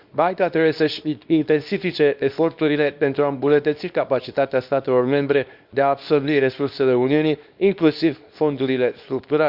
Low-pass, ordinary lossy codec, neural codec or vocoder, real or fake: 5.4 kHz; Opus, 64 kbps; codec, 24 kHz, 0.9 kbps, WavTokenizer, small release; fake